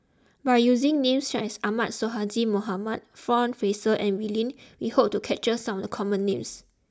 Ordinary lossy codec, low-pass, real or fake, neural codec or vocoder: none; none; real; none